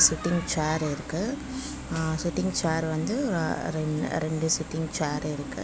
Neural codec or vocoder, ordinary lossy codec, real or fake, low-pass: none; none; real; none